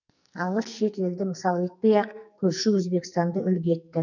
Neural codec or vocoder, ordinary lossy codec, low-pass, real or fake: codec, 44.1 kHz, 2.6 kbps, SNAC; none; 7.2 kHz; fake